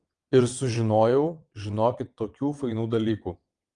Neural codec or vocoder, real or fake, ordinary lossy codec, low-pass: vocoder, 22.05 kHz, 80 mel bands, WaveNeXt; fake; Opus, 32 kbps; 9.9 kHz